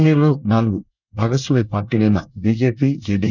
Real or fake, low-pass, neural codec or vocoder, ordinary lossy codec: fake; 7.2 kHz; codec, 24 kHz, 1 kbps, SNAC; none